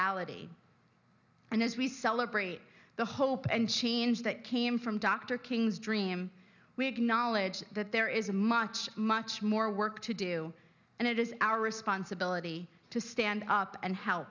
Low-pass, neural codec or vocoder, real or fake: 7.2 kHz; none; real